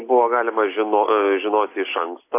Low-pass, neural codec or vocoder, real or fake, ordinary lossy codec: 3.6 kHz; none; real; AAC, 24 kbps